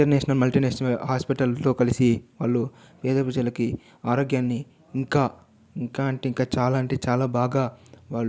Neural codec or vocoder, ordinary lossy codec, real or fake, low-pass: none; none; real; none